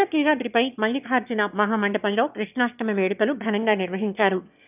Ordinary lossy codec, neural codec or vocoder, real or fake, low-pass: none; autoencoder, 22.05 kHz, a latent of 192 numbers a frame, VITS, trained on one speaker; fake; 3.6 kHz